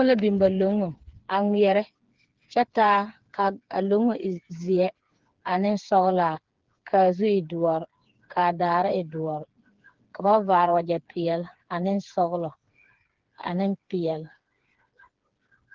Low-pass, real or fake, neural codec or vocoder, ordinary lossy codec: 7.2 kHz; fake; codec, 16 kHz, 4 kbps, FreqCodec, smaller model; Opus, 16 kbps